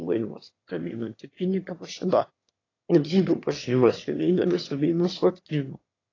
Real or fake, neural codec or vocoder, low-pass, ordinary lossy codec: fake; autoencoder, 22.05 kHz, a latent of 192 numbers a frame, VITS, trained on one speaker; 7.2 kHz; AAC, 32 kbps